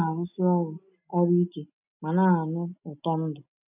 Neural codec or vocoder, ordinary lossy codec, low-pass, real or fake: none; none; 3.6 kHz; real